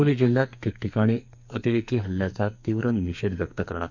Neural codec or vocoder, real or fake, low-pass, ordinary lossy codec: codec, 44.1 kHz, 2.6 kbps, SNAC; fake; 7.2 kHz; none